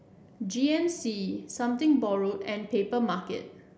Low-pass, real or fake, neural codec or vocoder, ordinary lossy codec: none; real; none; none